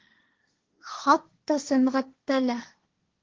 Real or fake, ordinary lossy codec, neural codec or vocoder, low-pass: fake; Opus, 16 kbps; codec, 16 kHz, 1.1 kbps, Voila-Tokenizer; 7.2 kHz